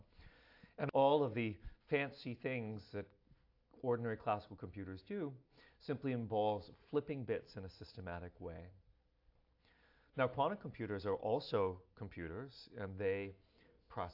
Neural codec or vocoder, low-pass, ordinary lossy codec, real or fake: none; 5.4 kHz; AAC, 48 kbps; real